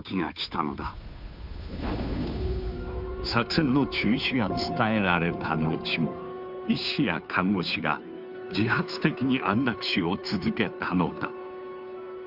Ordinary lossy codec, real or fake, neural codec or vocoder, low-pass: none; fake; codec, 16 kHz, 2 kbps, FunCodec, trained on Chinese and English, 25 frames a second; 5.4 kHz